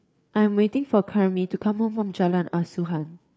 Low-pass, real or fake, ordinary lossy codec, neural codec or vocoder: none; fake; none; codec, 16 kHz, 4 kbps, FreqCodec, larger model